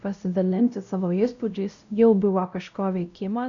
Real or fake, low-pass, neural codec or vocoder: fake; 7.2 kHz; codec, 16 kHz, 0.5 kbps, X-Codec, WavLM features, trained on Multilingual LibriSpeech